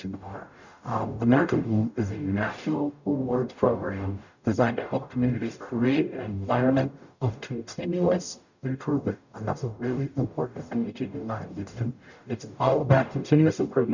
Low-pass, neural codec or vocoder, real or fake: 7.2 kHz; codec, 44.1 kHz, 0.9 kbps, DAC; fake